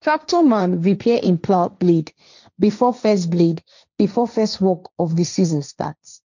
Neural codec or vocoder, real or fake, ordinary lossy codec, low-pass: codec, 16 kHz, 1.1 kbps, Voila-Tokenizer; fake; none; 7.2 kHz